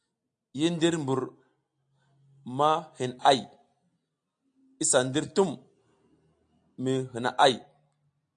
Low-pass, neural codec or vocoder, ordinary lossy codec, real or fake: 9.9 kHz; none; AAC, 64 kbps; real